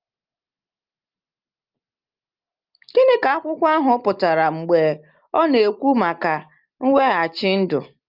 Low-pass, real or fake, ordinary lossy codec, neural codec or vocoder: 5.4 kHz; real; Opus, 24 kbps; none